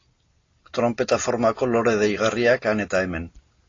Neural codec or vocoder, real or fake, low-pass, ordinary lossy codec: none; real; 7.2 kHz; AAC, 32 kbps